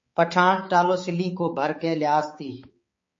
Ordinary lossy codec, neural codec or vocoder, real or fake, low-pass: MP3, 32 kbps; codec, 16 kHz, 4 kbps, X-Codec, HuBERT features, trained on balanced general audio; fake; 7.2 kHz